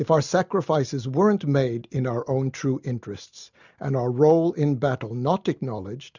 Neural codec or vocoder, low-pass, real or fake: none; 7.2 kHz; real